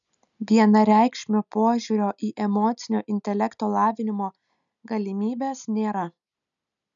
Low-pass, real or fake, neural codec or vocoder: 7.2 kHz; real; none